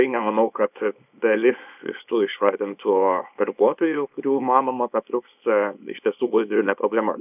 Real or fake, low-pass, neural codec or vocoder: fake; 3.6 kHz; codec, 24 kHz, 0.9 kbps, WavTokenizer, small release